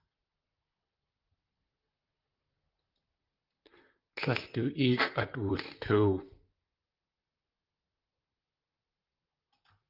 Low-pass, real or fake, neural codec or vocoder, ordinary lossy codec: 5.4 kHz; fake; vocoder, 44.1 kHz, 128 mel bands, Pupu-Vocoder; Opus, 24 kbps